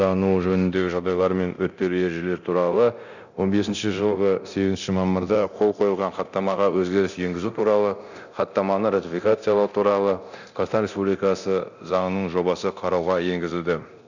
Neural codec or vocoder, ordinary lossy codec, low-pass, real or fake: codec, 24 kHz, 0.9 kbps, DualCodec; none; 7.2 kHz; fake